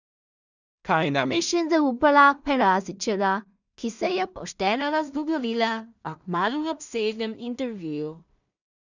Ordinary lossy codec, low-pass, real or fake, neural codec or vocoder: none; 7.2 kHz; fake; codec, 16 kHz in and 24 kHz out, 0.4 kbps, LongCat-Audio-Codec, two codebook decoder